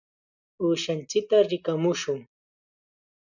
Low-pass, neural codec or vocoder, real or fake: 7.2 kHz; none; real